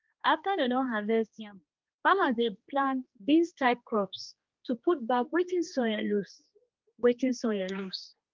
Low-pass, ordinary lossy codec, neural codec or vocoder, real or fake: 7.2 kHz; Opus, 32 kbps; codec, 16 kHz, 2 kbps, X-Codec, HuBERT features, trained on general audio; fake